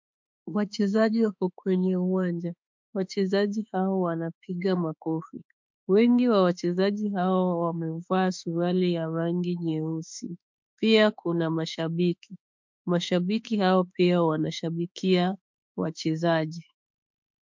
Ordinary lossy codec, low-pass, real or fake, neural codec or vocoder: MP3, 64 kbps; 7.2 kHz; fake; autoencoder, 48 kHz, 32 numbers a frame, DAC-VAE, trained on Japanese speech